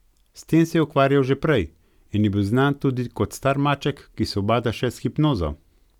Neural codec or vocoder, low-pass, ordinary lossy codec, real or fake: none; 19.8 kHz; none; real